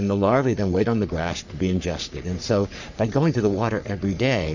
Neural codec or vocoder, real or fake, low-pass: codec, 44.1 kHz, 3.4 kbps, Pupu-Codec; fake; 7.2 kHz